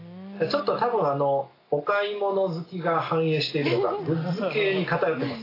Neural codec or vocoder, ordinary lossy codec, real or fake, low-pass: none; AAC, 24 kbps; real; 5.4 kHz